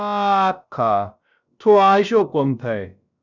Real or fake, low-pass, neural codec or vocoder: fake; 7.2 kHz; codec, 16 kHz, about 1 kbps, DyCAST, with the encoder's durations